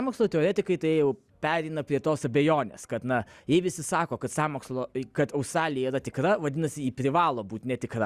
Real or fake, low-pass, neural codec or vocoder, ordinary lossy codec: real; 14.4 kHz; none; Opus, 64 kbps